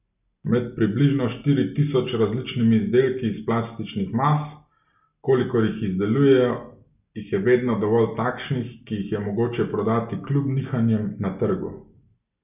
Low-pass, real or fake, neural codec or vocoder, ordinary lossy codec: 3.6 kHz; real; none; none